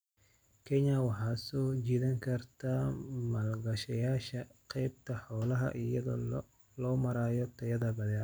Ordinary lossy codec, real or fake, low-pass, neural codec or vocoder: none; real; none; none